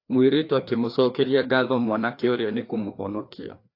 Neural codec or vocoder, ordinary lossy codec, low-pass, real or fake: codec, 16 kHz, 2 kbps, FreqCodec, larger model; AAC, 32 kbps; 5.4 kHz; fake